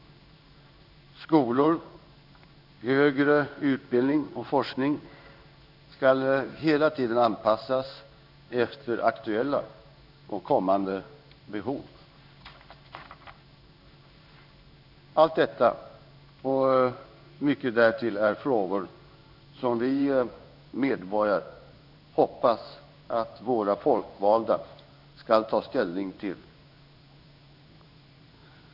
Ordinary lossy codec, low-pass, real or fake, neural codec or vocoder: none; 5.4 kHz; fake; codec, 16 kHz in and 24 kHz out, 1 kbps, XY-Tokenizer